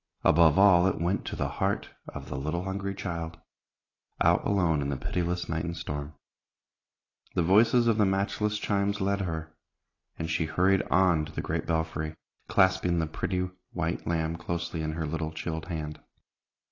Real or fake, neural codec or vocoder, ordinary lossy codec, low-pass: real; none; AAC, 32 kbps; 7.2 kHz